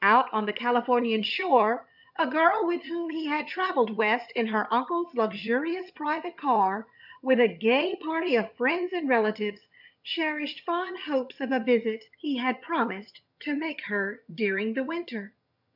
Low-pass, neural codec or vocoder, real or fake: 5.4 kHz; vocoder, 22.05 kHz, 80 mel bands, HiFi-GAN; fake